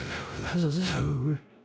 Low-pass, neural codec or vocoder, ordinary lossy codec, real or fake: none; codec, 16 kHz, 0.5 kbps, X-Codec, WavLM features, trained on Multilingual LibriSpeech; none; fake